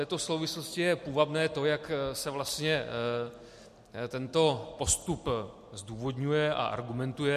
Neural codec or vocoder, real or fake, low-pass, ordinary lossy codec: none; real; 14.4 kHz; MP3, 64 kbps